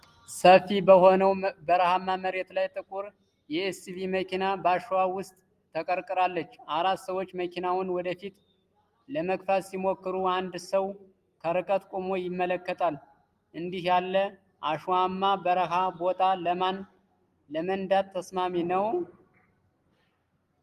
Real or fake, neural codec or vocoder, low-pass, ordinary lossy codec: real; none; 14.4 kHz; Opus, 24 kbps